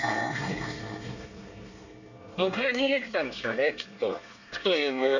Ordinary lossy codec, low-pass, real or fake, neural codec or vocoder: none; 7.2 kHz; fake; codec, 24 kHz, 1 kbps, SNAC